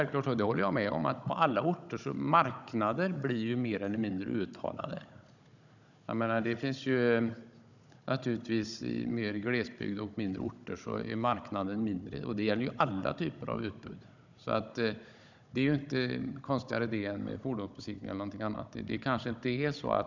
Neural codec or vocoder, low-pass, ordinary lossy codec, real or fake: codec, 16 kHz, 16 kbps, FunCodec, trained on Chinese and English, 50 frames a second; 7.2 kHz; none; fake